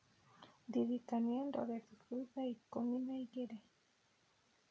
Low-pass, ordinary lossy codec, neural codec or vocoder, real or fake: none; none; none; real